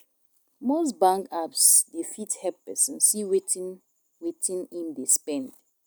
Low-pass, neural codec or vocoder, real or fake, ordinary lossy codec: none; none; real; none